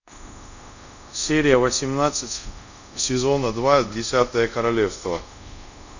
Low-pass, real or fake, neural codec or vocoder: 7.2 kHz; fake; codec, 24 kHz, 0.5 kbps, DualCodec